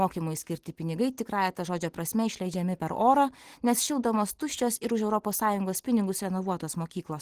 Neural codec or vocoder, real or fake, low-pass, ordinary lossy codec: none; real; 14.4 kHz; Opus, 16 kbps